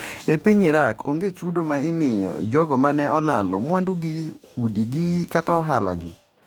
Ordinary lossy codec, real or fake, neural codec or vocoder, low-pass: none; fake; codec, 44.1 kHz, 2.6 kbps, DAC; none